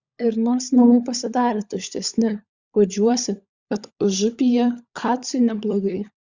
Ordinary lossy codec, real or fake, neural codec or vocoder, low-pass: Opus, 64 kbps; fake; codec, 16 kHz, 16 kbps, FunCodec, trained on LibriTTS, 50 frames a second; 7.2 kHz